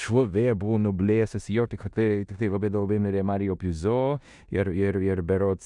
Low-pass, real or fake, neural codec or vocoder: 10.8 kHz; fake; codec, 16 kHz in and 24 kHz out, 0.9 kbps, LongCat-Audio-Codec, four codebook decoder